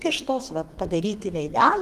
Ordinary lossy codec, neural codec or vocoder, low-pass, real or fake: Opus, 24 kbps; codec, 32 kHz, 1.9 kbps, SNAC; 14.4 kHz; fake